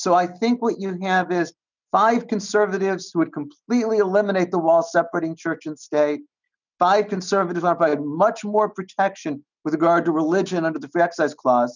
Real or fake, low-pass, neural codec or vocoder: real; 7.2 kHz; none